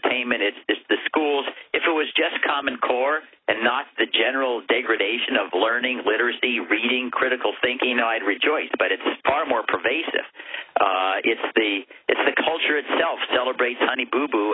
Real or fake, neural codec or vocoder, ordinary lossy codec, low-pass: real; none; AAC, 16 kbps; 7.2 kHz